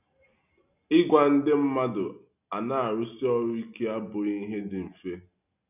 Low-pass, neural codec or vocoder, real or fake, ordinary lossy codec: 3.6 kHz; none; real; none